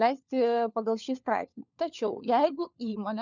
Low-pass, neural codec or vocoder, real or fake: 7.2 kHz; codec, 16 kHz, 4 kbps, FunCodec, trained on LibriTTS, 50 frames a second; fake